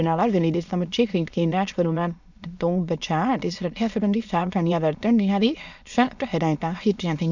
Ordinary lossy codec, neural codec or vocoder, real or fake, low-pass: none; autoencoder, 22.05 kHz, a latent of 192 numbers a frame, VITS, trained on many speakers; fake; 7.2 kHz